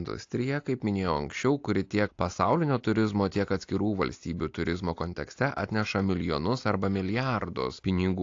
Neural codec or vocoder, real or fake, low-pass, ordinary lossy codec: none; real; 7.2 kHz; AAC, 48 kbps